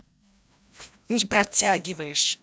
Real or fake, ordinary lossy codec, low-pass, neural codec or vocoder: fake; none; none; codec, 16 kHz, 1 kbps, FreqCodec, larger model